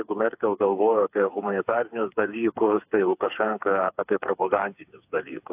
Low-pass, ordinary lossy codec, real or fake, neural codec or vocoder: 3.6 kHz; AAC, 32 kbps; fake; codec, 16 kHz, 4 kbps, FreqCodec, smaller model